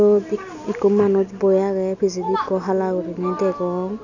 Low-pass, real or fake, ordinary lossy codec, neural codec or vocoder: 7.2 kHz; real; none; none